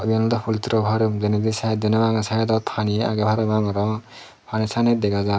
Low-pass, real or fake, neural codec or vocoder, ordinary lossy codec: none; real; none; none